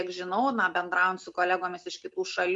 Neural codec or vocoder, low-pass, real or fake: none; 10.8 kHz; real